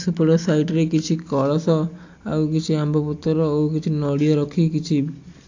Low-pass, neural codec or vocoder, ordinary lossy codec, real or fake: 7.2 kHz; none; AAC, 48 kbps; real